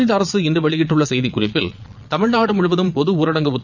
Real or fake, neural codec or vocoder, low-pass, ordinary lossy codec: fake; vocoder, 22.05 kHz, 80 mel bands, Vocos; 7.2 kHz; none